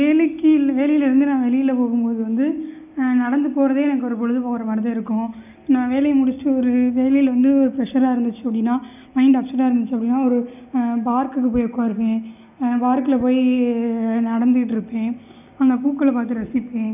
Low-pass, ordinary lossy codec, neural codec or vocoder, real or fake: 3.6 kHz; none; none; real